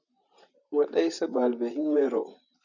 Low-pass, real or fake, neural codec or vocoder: 7.2 kHz; fake; vocoder, 44.1 kHz, 128 mel bands, Pupu-Vocoder